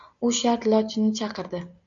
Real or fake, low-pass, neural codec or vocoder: real; 7.2 kHz; none